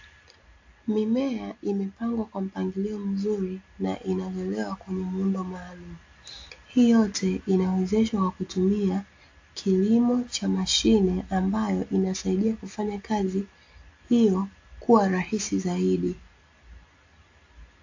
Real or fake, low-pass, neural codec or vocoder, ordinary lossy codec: real; 7.2 kHz; none; AAC, 48 kbps